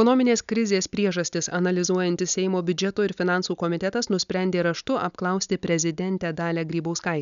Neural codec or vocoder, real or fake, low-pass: none; real; 7.2 kHz